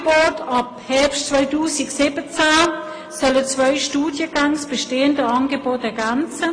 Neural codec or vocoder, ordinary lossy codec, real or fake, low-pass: none; AAC, 32 kbps; real; 9.9 kHz